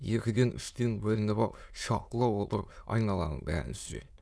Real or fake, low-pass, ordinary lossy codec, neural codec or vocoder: fake; none; none; autoencoder, 22.05 kHz, a latent of 192 numbers a frame, VITS, trained on many speakers